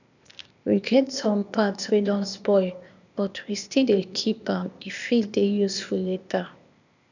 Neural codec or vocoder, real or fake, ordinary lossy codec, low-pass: codec, 16 kHz, 0.8 kbps, ZipCodec; fake; none; 7.2 kHz